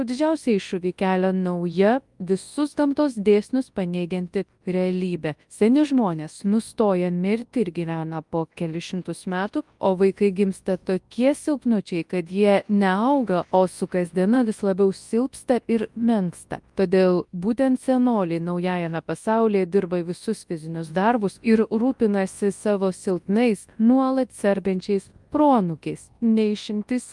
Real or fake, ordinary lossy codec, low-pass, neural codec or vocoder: fake; Opus, 32 kbps; 10.8 kHz; codec, 24 kHz, 0.9 kbps, WavTokenizer, large speech release